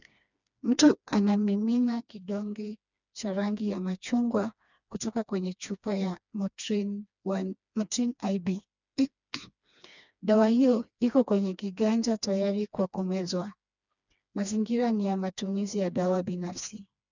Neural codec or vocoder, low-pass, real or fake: codec, 16 kHz, 2 kbps, FreqCodec, smaller model; 7.2 kHz; fake